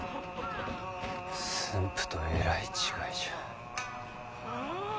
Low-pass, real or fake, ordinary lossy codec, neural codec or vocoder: none; real; none; none